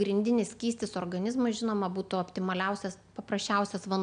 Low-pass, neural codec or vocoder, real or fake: 9.9 kHz; none; real